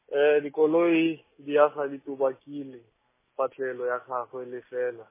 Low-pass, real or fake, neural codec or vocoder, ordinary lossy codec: 3.6 kHz; real; none; MP3, 16 kbps